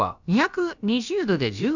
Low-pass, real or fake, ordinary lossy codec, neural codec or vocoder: 7.2 kHz; fake; none; codec, 16 kHz, about 1 kbps, DyCAST, with the encoder's durations